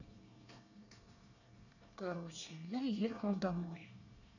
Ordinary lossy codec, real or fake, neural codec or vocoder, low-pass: none; fake; codec, 24 kHz, 1 kbps, SNAC; 7.2 kHz